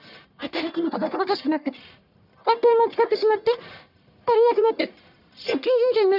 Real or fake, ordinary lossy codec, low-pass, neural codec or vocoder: fake; none; 5.4 kHz; codec, 44.1 kHz, 1.7 kbps, Pupu-Codec